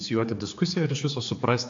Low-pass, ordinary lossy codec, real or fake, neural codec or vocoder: 7.2 kHz; AAC, 48 kbps; fake; codec, 16 kHz, 4 kbps, X-Codec, HuBERT features, trained on general audio